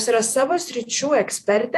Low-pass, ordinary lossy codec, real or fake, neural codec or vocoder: 14.4 kHz; AAC, 64 kbps; fake; vocoder, 48 kHz, 128 mel bands, Vocos